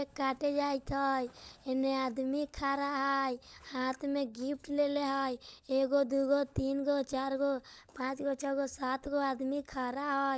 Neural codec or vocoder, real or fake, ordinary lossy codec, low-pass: codec, 16 kHz, 16 kbps, FunCodec, trained on LibriTTS, 50 frames a second; fake; none; none